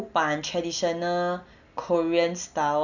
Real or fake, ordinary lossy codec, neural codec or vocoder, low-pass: real; none; none; 7.2 kHz